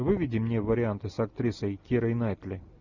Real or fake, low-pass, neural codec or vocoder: real; 7.2 kHz; none